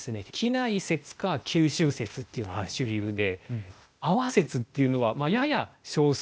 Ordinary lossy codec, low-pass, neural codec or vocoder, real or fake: none; none; codec, 16 kHz, 0.8 kbps, ZipCodec; fake